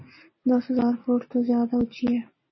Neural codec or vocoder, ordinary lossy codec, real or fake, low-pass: none; MP3, 24 kbps; real; 7.2 kHz